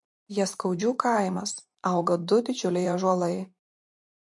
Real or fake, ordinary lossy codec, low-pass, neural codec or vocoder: fake; MP3, 48 kbps; 10.8 kHz; vocoder, 44.1 kHz, 128 mel bands every 512 samples, BigVGAN v2